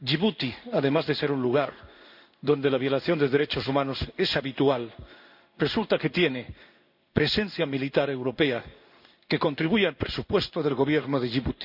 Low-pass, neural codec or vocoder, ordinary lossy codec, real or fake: 5.4 kHz; codec, 16 kHz in and 24 kHz out, 1 kbps, XY-Tokenizer; none; fake